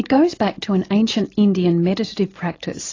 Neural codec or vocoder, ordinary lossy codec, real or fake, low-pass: none; AAC, 32 kbps; real; 7.2 kHz